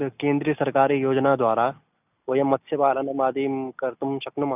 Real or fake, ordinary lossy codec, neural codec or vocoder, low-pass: real; none; none; 3.6 kHz